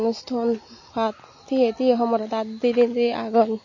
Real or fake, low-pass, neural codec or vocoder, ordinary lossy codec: real; 7.2 kHz; none; MP3, 32 kbps